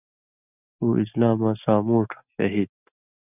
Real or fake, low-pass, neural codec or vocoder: real; 3.6 kHz; none